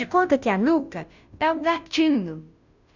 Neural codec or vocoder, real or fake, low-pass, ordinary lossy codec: codec, 16 kHz, 0.5 kbps, FunCodec, trained on Chinese and English, 25 frames a second; fake; 7.2 kHz; none